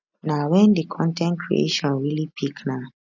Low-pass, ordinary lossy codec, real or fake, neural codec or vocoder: 7.2 kHz; none; real; none